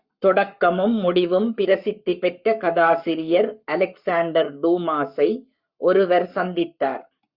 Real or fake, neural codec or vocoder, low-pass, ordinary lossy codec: fake; codec, 44.1 kHz, 7.8 kbps, Pupu-Codec; 5.4 kHz; Opus, 64 kbps